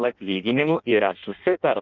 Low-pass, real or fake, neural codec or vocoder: 7.2 kHz; fake; codec, 16 kHz in and 24 kHz out, 0.6 kbps, FireRedTTS-2 codec